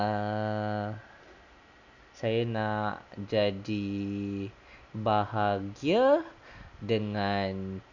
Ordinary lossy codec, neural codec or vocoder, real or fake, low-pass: none; none; real; 7.2 kHz